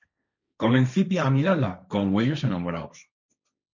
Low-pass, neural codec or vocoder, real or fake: 7.2 kHz; codec, 16 kHz, 1.1 kbps, Voila-Tokenizer; fake